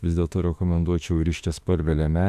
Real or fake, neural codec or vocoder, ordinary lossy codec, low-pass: fake; autoencoder, 48 kHz, 32 numbers a frame, DAC-VAE, trained on Japanese speech; AAC, 96 kbps; 14.4 kHz